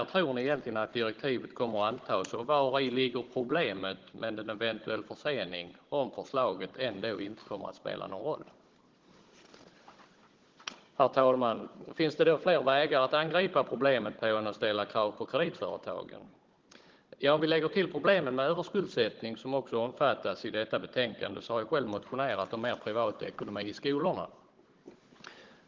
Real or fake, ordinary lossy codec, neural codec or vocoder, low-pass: fake; Opus, 16 kbps; codec, 16 kHz, 16 kbps, FunCodec, trained on Chinese and English, 50 frames a second; 7.2 kHz